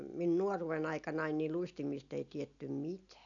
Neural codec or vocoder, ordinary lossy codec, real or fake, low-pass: none; none; real; 7.2 kHz